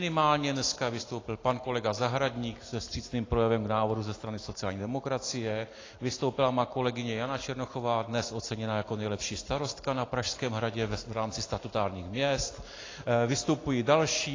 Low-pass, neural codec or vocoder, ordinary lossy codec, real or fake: 7.2 kHz; none; AAC, 32 kbps; real